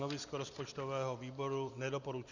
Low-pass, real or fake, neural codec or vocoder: 7.2 kHz; real; none